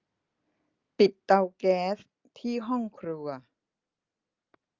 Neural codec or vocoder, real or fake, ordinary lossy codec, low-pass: none; real; Opus, 32 kbps; 7.2 kHz